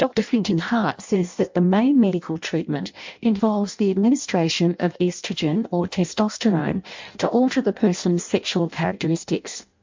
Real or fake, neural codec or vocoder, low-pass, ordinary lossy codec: fake; codec, 16 kHz in and 24 kHz out, 0.6 kbps, FireRedTTS-2 codec; 7.2 kHz; MP3, 64 kbps